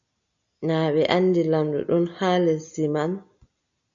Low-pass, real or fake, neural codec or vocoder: 7.2 kHz; real; none